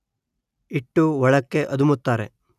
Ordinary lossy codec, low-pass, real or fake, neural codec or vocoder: none; 14.4 kHz; real; none